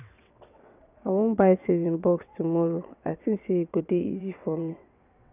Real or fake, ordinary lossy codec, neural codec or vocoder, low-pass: fake; none; vocoder, 22.05 kHz, 80 mel bands, WaveNeXt; 3.6 kHz